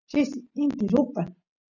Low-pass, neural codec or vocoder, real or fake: 7.2 kHz; none; real